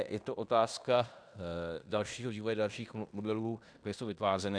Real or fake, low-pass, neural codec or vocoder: fake; 9.9 kHz; codec, 16 kHz in and 24 kHz out, 0.9 kbps, LongCat-Audio-Codec, fine tuned four codebook decoder